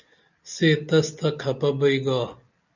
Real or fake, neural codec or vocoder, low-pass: real; none; 7.2 kHz